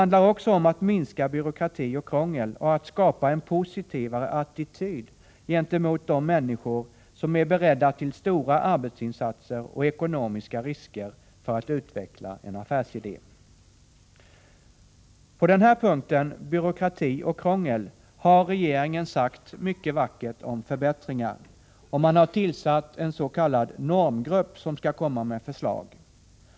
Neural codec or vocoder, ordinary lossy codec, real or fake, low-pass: none; none; real; none